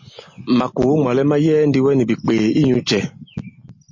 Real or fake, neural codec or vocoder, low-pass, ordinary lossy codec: real; none; 7.2 kHz; MP3, 32 kbps